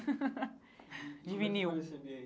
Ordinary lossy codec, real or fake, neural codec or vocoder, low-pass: none; real; none; none